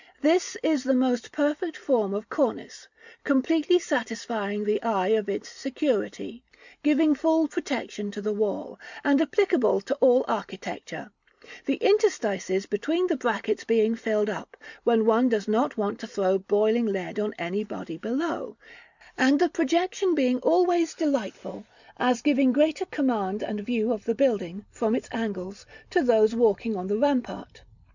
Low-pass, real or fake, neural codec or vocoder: 7.2 kHz; real; none